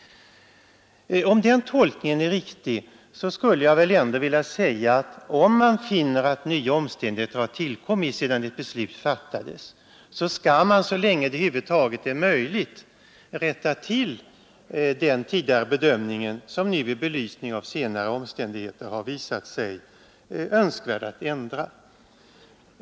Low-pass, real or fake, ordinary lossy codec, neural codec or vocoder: none; real; none; none